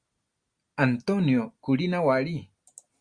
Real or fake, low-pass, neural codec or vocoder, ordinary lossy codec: real; 9.9 kHz; none; Opus, 64 kbps